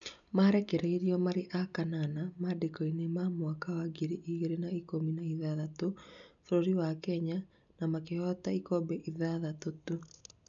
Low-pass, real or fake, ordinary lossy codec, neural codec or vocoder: 7.2 kHz; real; none; none